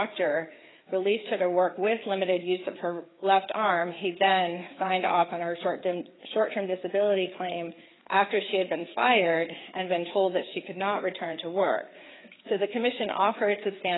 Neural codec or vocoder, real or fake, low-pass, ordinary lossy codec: codec, 16 kHz, 4 kbps, FreqCodec, larger model; fake; 7.2 kHz; AAC, 16 kbps